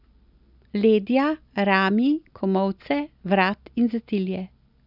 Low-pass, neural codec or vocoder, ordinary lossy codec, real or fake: 5.4 kHz; none; none; real